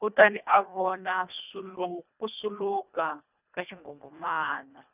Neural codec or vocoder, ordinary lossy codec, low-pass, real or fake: codec, 24 kHz, 1.5 kbps, HILCodec; none; 3.6 kHz; fake